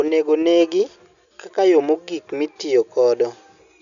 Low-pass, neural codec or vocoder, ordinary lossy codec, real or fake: 7.2 kHz; none; none; real